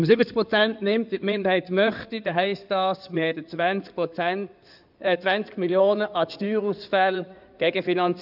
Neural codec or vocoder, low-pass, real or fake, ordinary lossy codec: codec, 16 kHz in and 24 kHz out, 2.2 kbps, FireRedTTS-2 codec; 5.4 kHz; fake; none